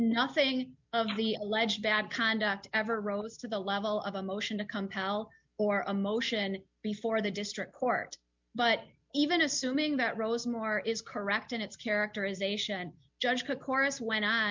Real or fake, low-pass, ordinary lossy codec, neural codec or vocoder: real; 7.2 kHz; MP3, 64 kbps; none